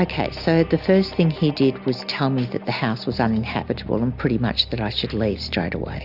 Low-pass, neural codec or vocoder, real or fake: 5.4 kHz; none; real